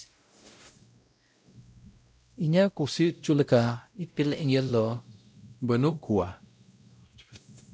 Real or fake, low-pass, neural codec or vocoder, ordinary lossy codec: fake; none; codec, 16 kHz, 0.5 kbps, X-Codec, WavLM features, trained on Multilingual LibriSpeech; none